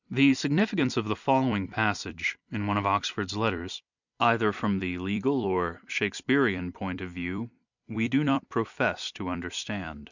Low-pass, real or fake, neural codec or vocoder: 7.2 kHz; fake; vocoder, 44.1 kHz, 128 mel bands every 256 samples, BigVGAN v2